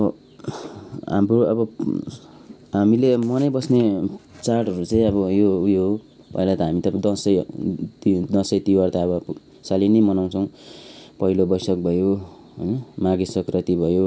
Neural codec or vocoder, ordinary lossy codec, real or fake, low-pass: none; none; real; none